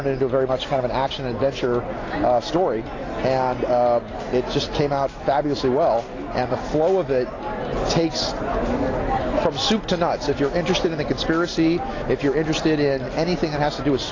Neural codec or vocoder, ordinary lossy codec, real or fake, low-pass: none; AAC, 32 kbps; real; 7.2 kHz